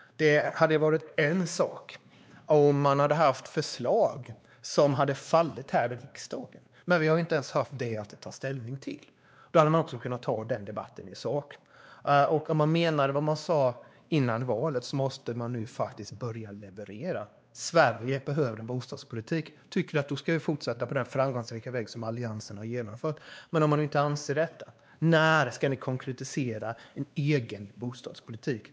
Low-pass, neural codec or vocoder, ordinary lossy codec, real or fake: none; codec, 16 kHz, 2 kbps, X-Codec, WavLM features, trained on Multilingual LibriSpeech; none; fake